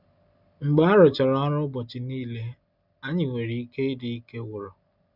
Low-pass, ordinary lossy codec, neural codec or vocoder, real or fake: 5.4 kHz; none; none; real